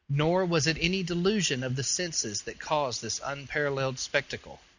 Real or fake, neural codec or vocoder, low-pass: real; none; 7.2 kHz